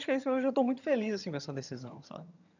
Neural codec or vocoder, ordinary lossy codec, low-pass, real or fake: vocoder, 22.05 kHz, 80 mel bands, HiFi-GAN; none; 7.2 kHz; fake